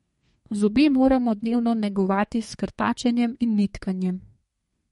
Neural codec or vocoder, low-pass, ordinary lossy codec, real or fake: codec, 32 kHz, 1.9 kbps, SNAC; 14.4 kHz; MP3, 48 kbps; fake